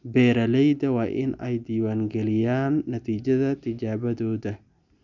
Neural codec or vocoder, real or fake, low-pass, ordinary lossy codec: none; real; 7.2 kHz; none